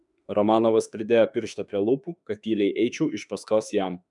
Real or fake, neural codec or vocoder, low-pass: fake; autoencoder, 48 kHz, 32 numbers a frame, DAC-VAE, trained on Japanese speech; 10.8 kHz